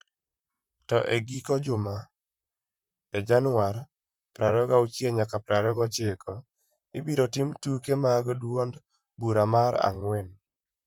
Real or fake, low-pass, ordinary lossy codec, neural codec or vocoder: fake; 19.8 kHz; none; vocoder, 44.1 kHz, 128 mel bands, Pupu-Vocoder